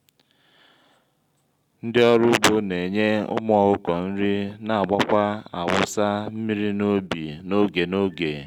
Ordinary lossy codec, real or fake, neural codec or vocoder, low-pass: none; real; none; 19.8 kHz